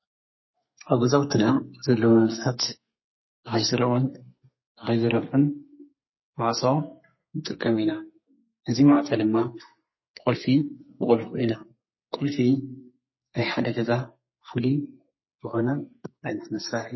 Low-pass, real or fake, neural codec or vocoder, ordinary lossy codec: 7.2 kHz; fake; codec, 32 kHz, 1.9 kbps, SNAC; MP3, 24 kbps